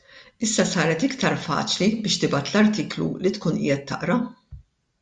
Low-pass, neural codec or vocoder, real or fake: 9.9 kHz; none; real